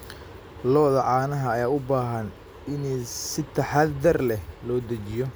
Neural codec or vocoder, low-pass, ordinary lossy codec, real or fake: none; none; none; real